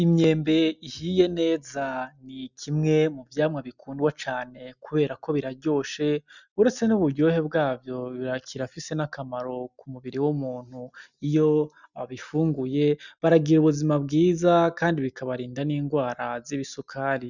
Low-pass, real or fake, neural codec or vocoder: 7.2 kHz; real; none